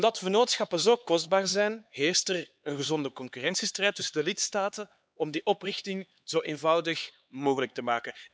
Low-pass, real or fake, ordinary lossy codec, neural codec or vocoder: none; fake; none; codec, 16 kHz, 4 kbps, X-Codec, HuBERT features, trained on LibriSpeech